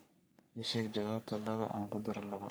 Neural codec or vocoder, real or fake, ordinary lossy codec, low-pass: codec, 44.1 kHz, 3.4 kbps, Pupu-Codec; fake; none; none